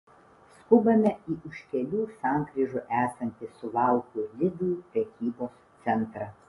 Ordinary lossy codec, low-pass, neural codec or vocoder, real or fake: AAC, 32 kbps; 10.8 kHz; none; real